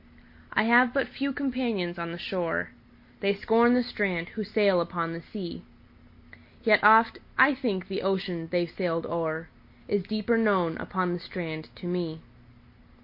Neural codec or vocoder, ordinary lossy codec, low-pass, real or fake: none; MP3, 32 kbps; 5.4 kHz; real